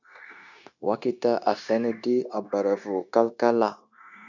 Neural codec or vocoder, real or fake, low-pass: codec, 16 kHz, 0.9 kbps, LongCat-Audio-Codec; fake; 7.2 kHz